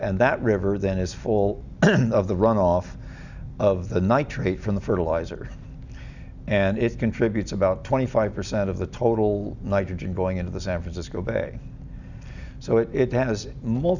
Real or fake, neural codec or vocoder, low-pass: real; none; 7.2 kHz